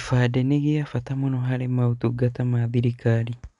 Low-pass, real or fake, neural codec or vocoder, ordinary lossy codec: 10.8 kHz; real; none; none